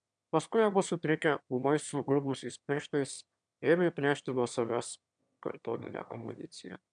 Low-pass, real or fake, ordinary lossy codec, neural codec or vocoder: 9.9 kHz; fake; MP3, 96 kbps; autoencoder, 22.05 kHz, a latent of 192 numbers a frame, VITS, trained on one speaker